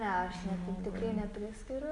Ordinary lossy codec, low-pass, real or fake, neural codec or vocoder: MP3, 64 kbps; 10.8 kHz; real; none